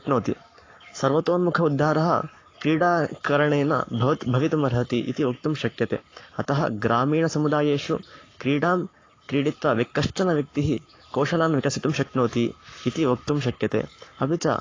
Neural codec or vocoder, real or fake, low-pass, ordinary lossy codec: codec, 44.1 kHz, 7.8 kbps, Pupu-Codec; fake; 7.2 kHz; AAC, 32 kbps